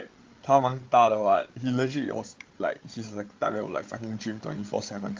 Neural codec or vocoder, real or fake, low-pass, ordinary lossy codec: codec, 16 kHz, 8 kbps, FreqCodec, larger model; fake; 7.2 kHz; Opus, 32 kbps